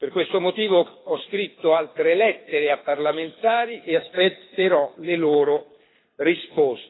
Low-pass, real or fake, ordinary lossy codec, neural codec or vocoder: 7.2 kHz; fake; AAC, 16 kbps; codec, 24 kHz, 6 kbps, HILCodec